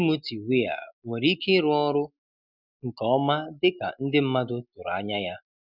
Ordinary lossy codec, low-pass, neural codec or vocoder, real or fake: none; 5.4 kHz; none; real